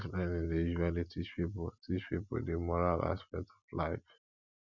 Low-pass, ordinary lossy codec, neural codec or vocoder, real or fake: 7.2 kHz; none; none; real